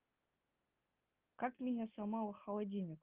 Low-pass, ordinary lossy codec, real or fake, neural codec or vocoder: 3.6 kHz; Opus, 16 kbps; real; none